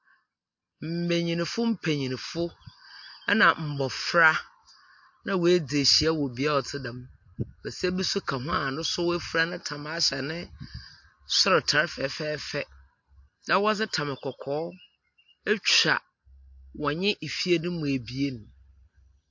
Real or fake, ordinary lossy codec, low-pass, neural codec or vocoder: real; MP3, 48 kbps; 7.2 kHz; none